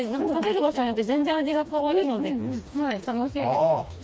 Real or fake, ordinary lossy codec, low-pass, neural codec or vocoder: fake; none; none; codec, 16 kHz, 2 kbps, FreqCodec, smaller model